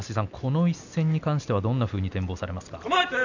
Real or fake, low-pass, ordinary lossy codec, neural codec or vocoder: real; 7.2 kHz; none; none